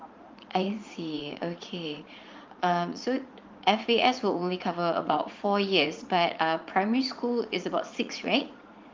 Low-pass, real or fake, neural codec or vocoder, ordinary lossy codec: 7.2 kHz; fake; vocoder, 44.1 kHz, 80 mel bands, Vocos; Opus, 32 kbps